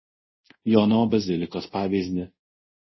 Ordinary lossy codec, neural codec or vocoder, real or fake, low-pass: MP3, 24 kbps; codec, 24 kHz, 0.5 kbps, DualCodec; fake; 7.2 kHz